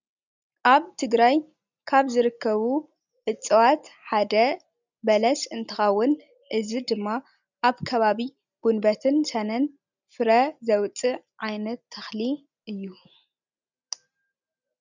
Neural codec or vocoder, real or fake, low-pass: none; real; 7.2 kHz